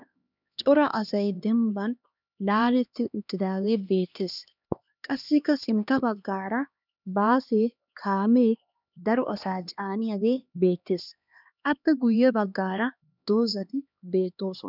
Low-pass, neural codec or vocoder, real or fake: 5.4 kHz; codec, 16 kHz, 2 kbps, X-Codec, HuBERT features, trained on LibriSpeech; fake